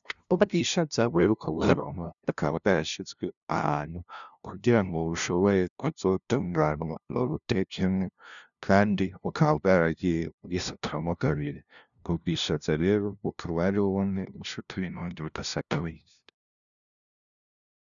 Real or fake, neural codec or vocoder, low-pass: fake; codec, 16 kHz, 0.5 kbps, FunCodec, trained on LibriTTS, 25 frames a second; 7.2 kHz